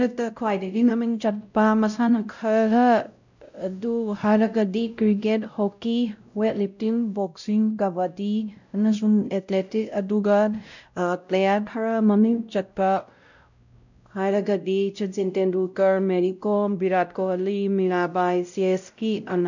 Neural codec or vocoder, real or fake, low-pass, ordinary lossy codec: codec, 16 kHz, 0.5 kbps, X-Codec, WavLM features, trained on Multilingual LibriSpeech; fake; 7.2 kHz; none